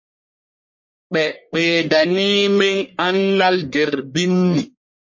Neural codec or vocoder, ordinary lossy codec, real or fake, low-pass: codec, 44.1 kHz, 1.7 kbps, Pupu-Codec; MP3, 32 kbps; fake; 7.2 kHz